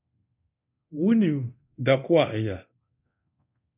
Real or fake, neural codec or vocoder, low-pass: fake; codec, 24 kHz, 0.9 kbps, DualCodec; 3.6 kHz